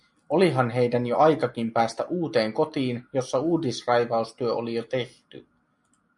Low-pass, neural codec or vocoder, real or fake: 10.8 kHz; none; real